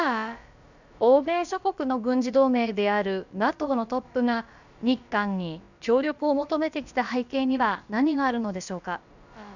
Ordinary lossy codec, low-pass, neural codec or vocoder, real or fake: none; 7.2 kHz; codec, 16 kHz, about 1 kbps, DyCAST, with the encoder's durations; fake